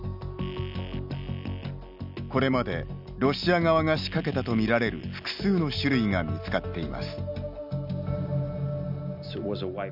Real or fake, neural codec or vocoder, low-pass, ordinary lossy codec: real; none; 5.4 kHz; none